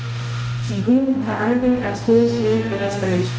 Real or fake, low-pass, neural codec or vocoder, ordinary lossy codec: fake; none; codec, 16 kHz, 0.5 kbps, X-Codec, HuBERT features, trained on balanced general audio; none